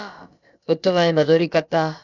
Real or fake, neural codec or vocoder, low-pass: fake; codec, 16 kHz, about 1 kbps, DyCAST, with the encoder's durations; 7.2 kHz